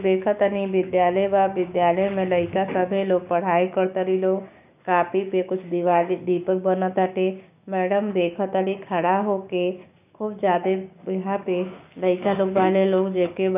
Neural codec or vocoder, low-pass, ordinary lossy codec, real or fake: codec, 16 kHz, 6 kbps, DAC; 3.6 kHz; none; fake